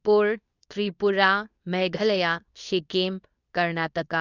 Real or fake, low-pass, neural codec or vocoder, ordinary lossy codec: fake; 7.2 kHz; codec, 24 kHz, 0.9 kbps, WavTokenizer, medium speech release version 2; none